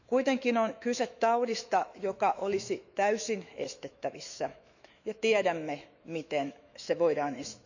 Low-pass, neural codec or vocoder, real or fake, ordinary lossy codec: 7.2 kHz; autoencoder, 48 kHz, 128 numbers a frame, DAC-VAE, trained on Japanese speech; fake; none